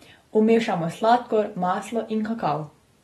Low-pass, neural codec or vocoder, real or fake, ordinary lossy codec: 14.4 kHz; none; real; AAC, 32 kbps